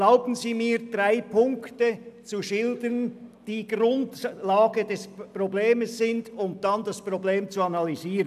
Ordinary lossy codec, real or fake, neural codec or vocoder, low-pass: none; real; none; 14.4 kHz